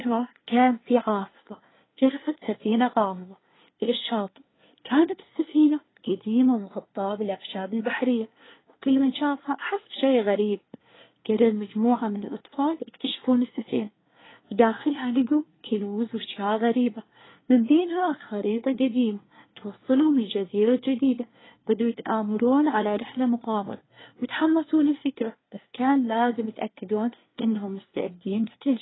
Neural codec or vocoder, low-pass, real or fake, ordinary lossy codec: codec, 24 kHz, 1 kbps, SNAC; 7.2 kHz; fake; AAC, 16 kbps